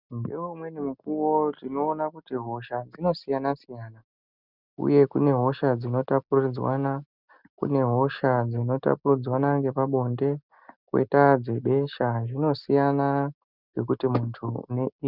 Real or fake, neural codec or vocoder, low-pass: real; none; 5.4 kHz